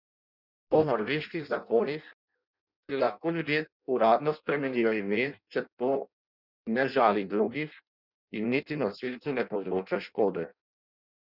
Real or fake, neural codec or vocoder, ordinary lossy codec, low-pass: fake; codec, 16 kHz in and 24 kHz out, 0.6 kbps, FireRedTTS-2 codec; none; 5.4 kHz